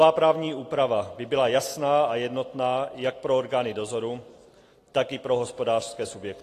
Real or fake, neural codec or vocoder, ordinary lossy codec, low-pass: real; none; AAC, 48 kbps; 14.4 kHz